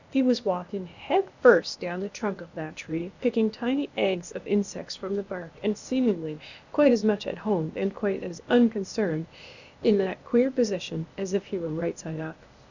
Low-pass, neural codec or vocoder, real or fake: 7.2 kHz; codec, 16 kHz, 0.8 kbps, ZipCodec; fake